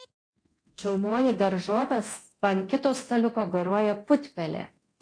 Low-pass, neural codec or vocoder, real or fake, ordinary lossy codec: 9.9 kHz; codec, 24 kHz, 0.9 kbps, DualCodec; fake; AAC, 48 kbps